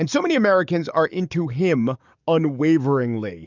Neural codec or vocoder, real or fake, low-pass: codec, 44.1 kHz, 7.8 kbps, DAC; fake; 7.2 kHz